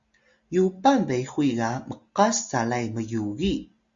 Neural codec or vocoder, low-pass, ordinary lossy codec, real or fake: none; 7.2 kHz; Opus, 64 kbps; real